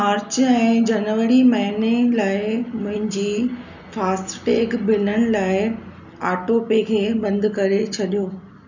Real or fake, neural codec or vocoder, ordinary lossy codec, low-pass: real; none; none; 7.2 kHz